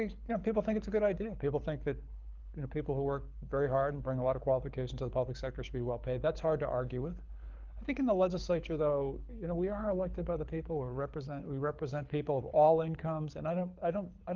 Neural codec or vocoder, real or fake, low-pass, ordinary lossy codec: codec, 24 kHz, 6 kbps, HILCodec; fake; 7.2 kHz; Opus, 24 kbps